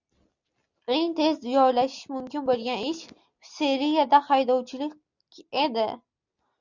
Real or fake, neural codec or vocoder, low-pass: real; none; 7.2 kHz